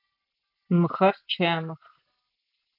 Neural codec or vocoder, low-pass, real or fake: none; 5.4 kHz; real